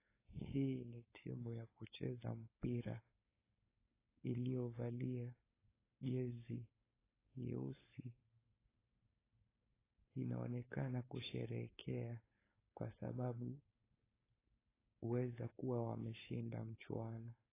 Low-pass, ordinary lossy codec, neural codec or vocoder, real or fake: 3.6 kHz; AAC, 16 kbps; codec, 16 kHz, 4.8 kbps, FACodec; fake